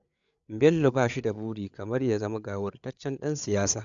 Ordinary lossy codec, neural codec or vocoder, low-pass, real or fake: none; codec, 16 kHz, 8 kbps, FreqCodec, larger model; 7.2 kHz; fake